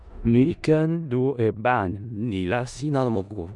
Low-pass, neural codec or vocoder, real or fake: 10.8 kHz; codec, 16 kHz in and 24 kHz out, 0.4 kbps, LongCat-Audio-Codec, four codebook decoder; fake